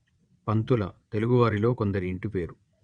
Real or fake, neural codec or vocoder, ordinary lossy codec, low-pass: fake; vocoder, 22.05 kHz, 80 mel bands, WaveNeXt; Opus, 64 kbps; 9.9 kHz